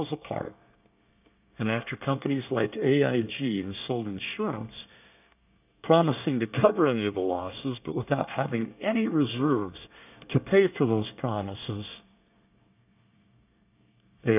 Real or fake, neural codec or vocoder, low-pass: fake; codec, 24 kHz, 1 kbps, SNAC; 3.6 kHz